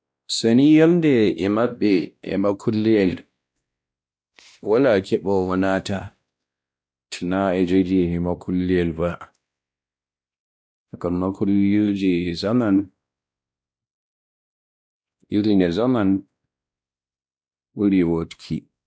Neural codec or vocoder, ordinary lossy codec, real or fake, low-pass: codec, 16 kHz, 1 kbps, X-Codec, WavLM features, trained on Multilingual LibriSpeech; none; fake; none